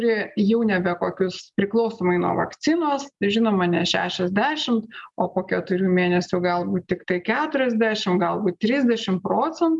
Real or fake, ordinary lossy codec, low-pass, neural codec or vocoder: real; MP3, 96 kbps; 10.8 kHz; none